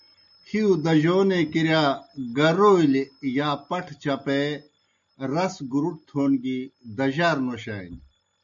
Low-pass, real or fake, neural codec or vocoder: 7.2 kHz; real; none